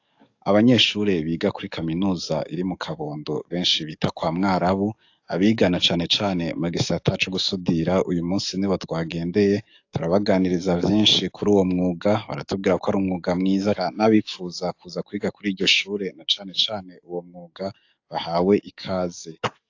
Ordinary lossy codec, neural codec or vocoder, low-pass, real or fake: AAC, 48 kbps; codec, 44.1 kHz, 7.8 kbps, DAC; 7.2 kHz; fake